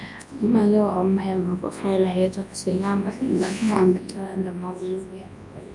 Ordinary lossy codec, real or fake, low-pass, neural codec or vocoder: MP3, 96 kbps; fake; 10.8 kHz; codec, 24 kHz, 0.9 kbps, WavTokenizer, large speech release